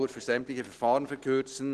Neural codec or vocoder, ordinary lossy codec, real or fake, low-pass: none; Opus, 16 kbps; real; 10.8 kHz